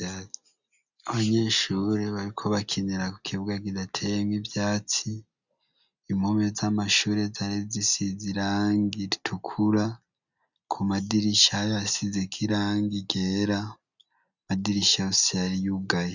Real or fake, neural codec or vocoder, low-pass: real; none; 7.2 kHz